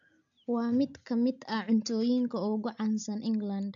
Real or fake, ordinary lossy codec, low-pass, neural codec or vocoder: real; none; 7.2 kHz; none